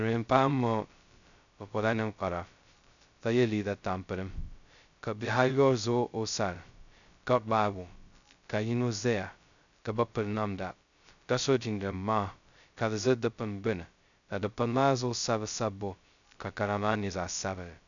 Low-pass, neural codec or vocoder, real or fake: 7.2 kHz; codec, 16 kHz, 0.2 kbps, FocalCodec; fake